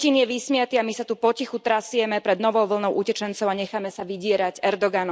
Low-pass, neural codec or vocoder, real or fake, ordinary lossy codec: none; none; real; none